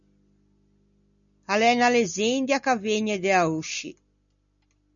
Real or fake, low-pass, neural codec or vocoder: real; 7.2 kHz; none